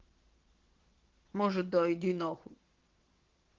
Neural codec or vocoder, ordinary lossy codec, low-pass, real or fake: vocoder, 22.05 kHz, 80 mel bands, WaveNeXt; Opus, 16 kbps; 7.2 kHz; fake